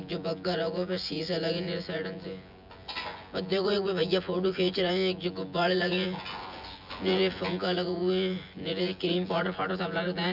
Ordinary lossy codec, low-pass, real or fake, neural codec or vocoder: none; 5.4 kHz; fake; vocoder, 24 kHz, 100 mel bands, Vocos